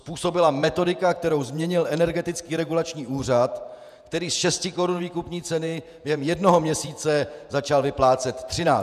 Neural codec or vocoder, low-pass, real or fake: vocoder, 48 kHz, 128 mel bands, Vocos; 14.4 kHz; fake